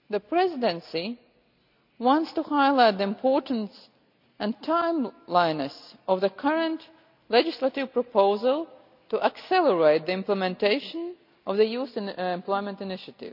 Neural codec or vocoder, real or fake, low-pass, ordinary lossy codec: none; real; 5.4 kHz; none